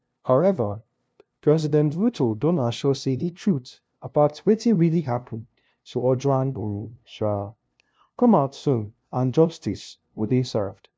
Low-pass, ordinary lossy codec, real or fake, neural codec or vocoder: none; none; fake; codec, 16 kHz, 0.5 kbps, FunCodec, trained on LibriTTS, 25 frames a second